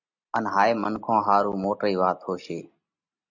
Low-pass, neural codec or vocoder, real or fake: 7.2 kHz; none; real